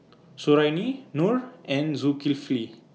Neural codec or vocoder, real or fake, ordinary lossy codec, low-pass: none; real; none; none